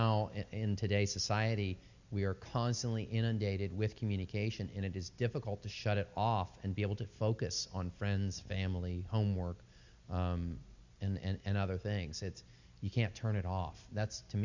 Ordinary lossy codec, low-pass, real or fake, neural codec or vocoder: Opus, 64 kbps; 7.2 kHz; real; none